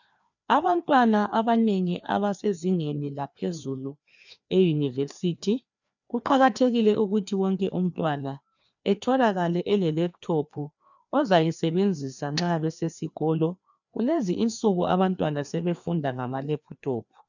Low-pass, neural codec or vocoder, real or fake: 7.2 kHz; codec, 16 kHz, 2 kbps, FreqCodec, larger model; fake